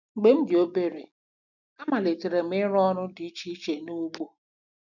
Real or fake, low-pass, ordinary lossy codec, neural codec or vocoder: real; 7.2 kHz; none; none